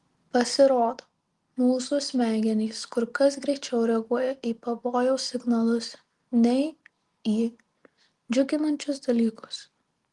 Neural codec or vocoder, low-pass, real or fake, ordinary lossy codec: none; 10.8 kHz; real; Opus, 24 kbps